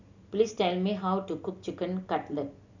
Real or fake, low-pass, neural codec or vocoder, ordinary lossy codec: real; 7.2 kHz; none; none